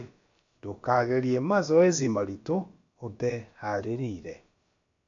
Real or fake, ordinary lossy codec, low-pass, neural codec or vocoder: fake; AAC, 48 kbps; 7.2 kHz; codec, 16 kHz, about 1 kbps, DyCAST, with the encoder's durations